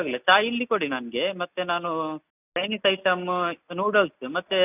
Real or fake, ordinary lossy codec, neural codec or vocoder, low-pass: real; none; none; 3.6 kHz